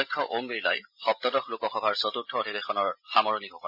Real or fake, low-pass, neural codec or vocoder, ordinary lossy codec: real; 5.4 kHz; none; none